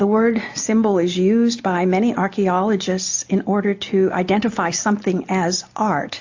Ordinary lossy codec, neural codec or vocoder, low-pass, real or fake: AAC, 48 kbps; none; 7.2 kHz; real